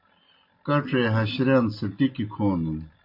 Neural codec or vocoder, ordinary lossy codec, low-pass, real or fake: none; MP3, 32 kbps; 5.4 kHz; real